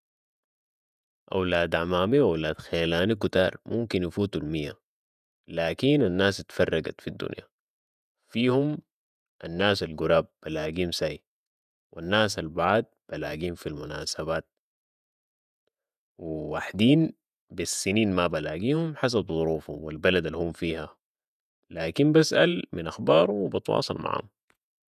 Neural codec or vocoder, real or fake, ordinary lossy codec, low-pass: vocoder, 48 kHz, 128 mel bands, Vocos; fake; none; 14.4 kHz